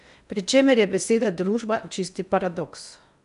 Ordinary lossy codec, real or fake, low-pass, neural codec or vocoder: none; fake; 10.8 kHz; codec, 16 kHz in and 24 kHz out, 0.8 kbps, FocalCodec, streaming, 65536 codes